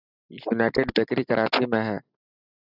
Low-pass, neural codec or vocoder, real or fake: 5.4 kHz; none; real